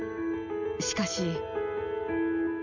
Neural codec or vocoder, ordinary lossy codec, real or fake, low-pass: none; none; real; 7.2 kHz